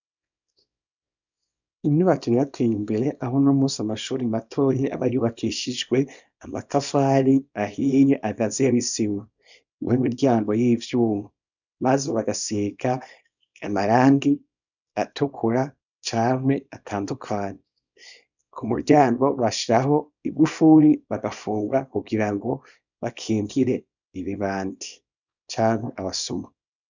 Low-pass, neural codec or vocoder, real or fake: 7.2 kHz; codec, 24 kHz, 0.9 kbps, WavTokenizer, small release; fake